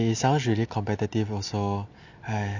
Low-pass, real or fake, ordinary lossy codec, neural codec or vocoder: 7.2 kHz; real; none; none